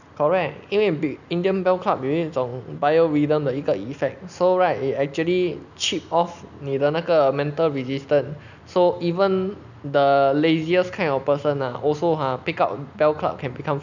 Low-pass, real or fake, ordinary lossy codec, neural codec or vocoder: 7.2 kHz; real; none; none